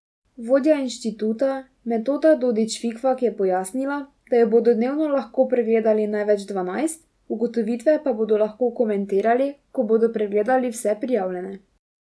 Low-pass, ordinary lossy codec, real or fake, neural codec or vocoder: none; none; real; none